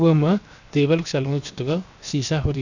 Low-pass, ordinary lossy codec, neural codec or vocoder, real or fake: 7.2 kHz; none; codec, 16 kHz, 0.7 kbps, FocalCodec; fake